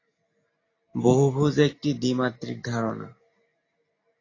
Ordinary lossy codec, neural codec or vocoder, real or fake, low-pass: AAC, 32 kbps; none; real; 7.2 kHz